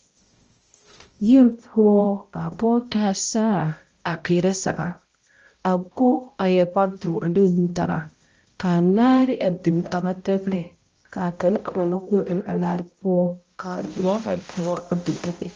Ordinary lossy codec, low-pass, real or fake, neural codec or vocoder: Opus, 24 kbps; 7.2 kHz; fake; codec, 16 kHz, 0.5 kbps, X-Codec, HuBERT features, trained on balanced general audio